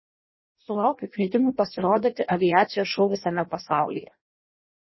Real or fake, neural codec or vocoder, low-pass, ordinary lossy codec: fake; codec, 16 kHz in and 24 kHz out, 0.6 kbps, FireRedTTS-2 codec; 7.2 kHz; MP3, 24 kbps